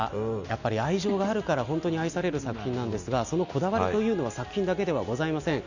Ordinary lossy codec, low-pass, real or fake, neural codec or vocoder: none; 7.2 kHz; real; none